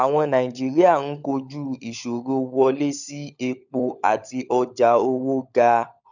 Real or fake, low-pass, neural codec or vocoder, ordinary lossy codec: fake; 7.2 kHz; codec, 16 kHz, 16 kbps, FunCodec, trained on LibriTTS, 50 frames a second; none